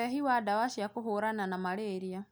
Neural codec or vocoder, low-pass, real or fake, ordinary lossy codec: none; none; real; none